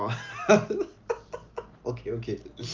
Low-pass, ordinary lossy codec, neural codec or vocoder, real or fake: 7.2 kHz; Opus, 32 kbps; none; real